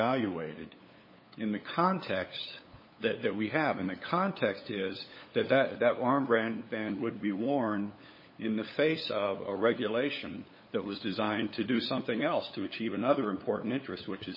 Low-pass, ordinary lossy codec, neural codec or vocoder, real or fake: 5.4 kHz; MP3, 24 kbps; codec, 16 kHz, 8 kbps, FunCodec, trained on LibriTTS, 25 frames a second; fake